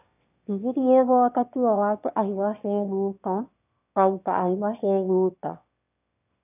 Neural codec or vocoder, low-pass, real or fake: autoencoder, 22.05 kHz, a latent of 192 numbers a frame, VITS, trained on one speaker; 3.6 kHz; fake